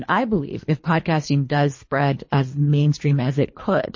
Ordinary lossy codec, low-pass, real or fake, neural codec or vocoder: MP3, 32 kbps; 7.2 kHz; fake; codec, 24 kHz, 3 kbps, HILCodec